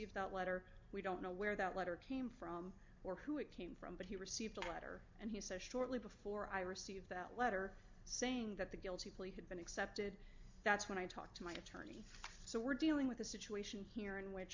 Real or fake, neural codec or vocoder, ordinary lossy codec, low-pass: real; none; MP3, 64 kbps; 7.2 kHz